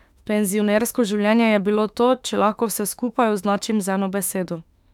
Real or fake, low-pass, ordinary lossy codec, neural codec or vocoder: fake; 19.8 kHz; none; autoencoder, 48 kHz, 32 numbers a frame, DAC-VAE, trained on Japanese speech